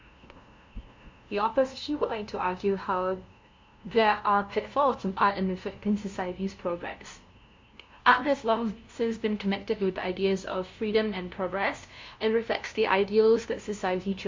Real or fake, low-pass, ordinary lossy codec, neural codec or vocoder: fake; 7.2 kHz; MP3, 48 kbps; codec, 16 kHz, 0.5 kbps, FunCodec, trained on LibriTTS, 25 frames a second